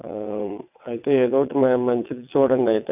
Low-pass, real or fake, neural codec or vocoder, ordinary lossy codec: 3.6 kHz; fake; vocoder, 22.05 kHz, 80 mel bands, WaveNeXt; none